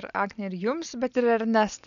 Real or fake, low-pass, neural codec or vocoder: real; 7.2 kHz; none